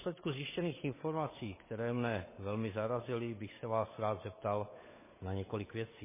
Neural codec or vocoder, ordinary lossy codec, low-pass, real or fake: codec, 16 kHz, 8 kbps, FunCodec, trained on Chinese and English, 25 frames a second; MP3, 16 kbps; 3.6 kHz; fake